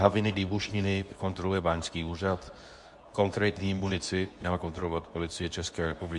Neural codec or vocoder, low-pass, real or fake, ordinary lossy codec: codec, 24 kHz, 0.9 kbps, WavTokenizer, medium speech release version 2; 10.8 kHz; fake; MP3, 64 kbps